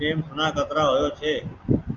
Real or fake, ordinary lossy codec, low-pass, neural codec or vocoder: real; Opus, 24 kbps; 7.2 kHz; none